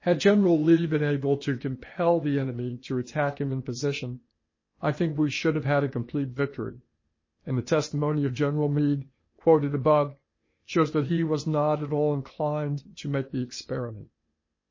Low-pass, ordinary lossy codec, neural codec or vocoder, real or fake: 7.2 kHz; MP3, 32 kbps; codec, 16 kHz, 0.8 kbps, ZipCodec; fake